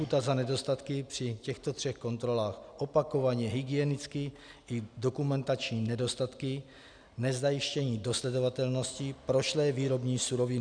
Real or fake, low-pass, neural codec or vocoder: real; 9.9 kHz; none